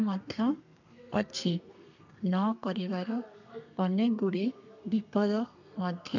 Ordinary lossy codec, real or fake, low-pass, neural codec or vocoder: none; fake; 7.2 kHz; codec, 32 kHz, 1.9 kbps, SNAC